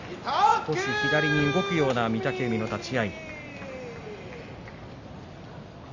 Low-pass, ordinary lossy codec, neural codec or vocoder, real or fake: 7.2 kHz; Opus, 64 kbps; none; real